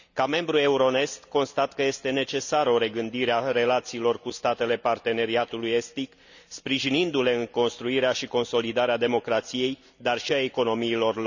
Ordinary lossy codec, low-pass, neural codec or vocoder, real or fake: none; 7.2 kHz; none; real